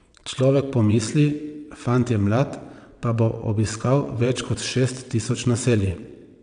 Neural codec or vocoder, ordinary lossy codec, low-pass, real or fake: vocoder, 22.05 kHz, 80 mel bands, WaveNeXt; none; 9.9 kHz; fake